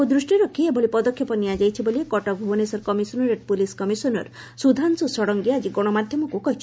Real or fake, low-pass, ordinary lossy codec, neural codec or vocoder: real; none; none; none